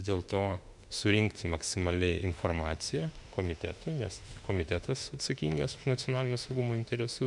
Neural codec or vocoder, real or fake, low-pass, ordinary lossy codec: autoencoder, 48 kHz, 32 numbers a frame, DAC-VAE, trained on Japanese speech; fake; 10.8 kHz; MP3, 96 kbps